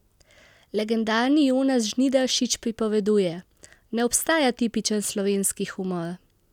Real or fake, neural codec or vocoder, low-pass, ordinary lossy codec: real; none; 19.8 kHz; none